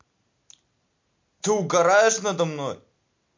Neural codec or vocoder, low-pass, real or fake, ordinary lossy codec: none; 7.2 kHz; real; MP3, 48 kbps